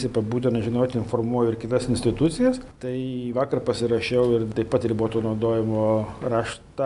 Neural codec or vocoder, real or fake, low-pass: none; real; 10.8 kHz